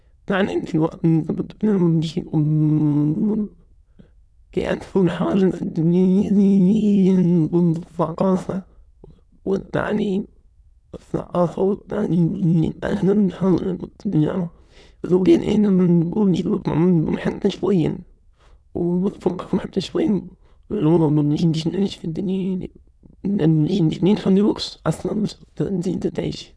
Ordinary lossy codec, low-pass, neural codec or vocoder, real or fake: none; none; autoencoder, 22.05 kHz, a latent of 192 numbers a frame, VITS, trained on many speakers; fake